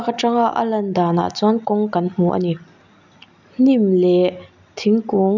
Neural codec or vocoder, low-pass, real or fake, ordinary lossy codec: none; 7.2 kHz; real; none